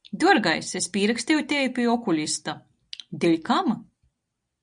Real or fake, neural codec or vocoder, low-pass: real; none; 9.9 kHz